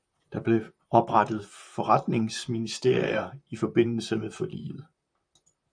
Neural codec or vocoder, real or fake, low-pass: vocoder, 44.1 kHz, 128 mel bands, Pupu-Vocoder; fake; 9.9 kHz